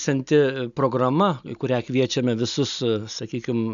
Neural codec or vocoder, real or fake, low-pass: none; real; 7.2 kHz